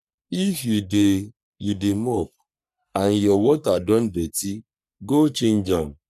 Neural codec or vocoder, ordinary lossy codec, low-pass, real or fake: codec, 44.1 kHz, 3.4 kbps, Pupu-Codec; none; 14.4 kHz; fake